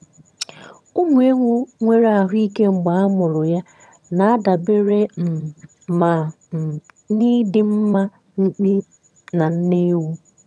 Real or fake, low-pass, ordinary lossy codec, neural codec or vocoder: fake; none; none; vocoder, 22.05 kHz, 80 mel bands, HiFi-GAN